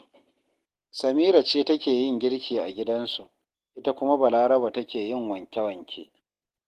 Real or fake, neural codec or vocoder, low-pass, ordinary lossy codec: real; none; 14.4 kHz; Opus, 16 kbps